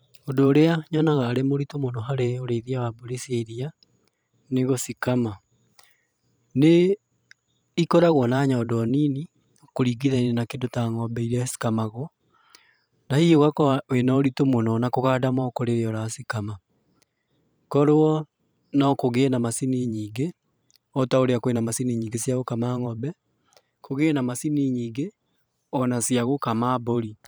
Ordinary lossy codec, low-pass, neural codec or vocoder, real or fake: none; none; vocoder, 44.1 kHz, 128 mel bands every 512 samples, BigVGAN v2; fake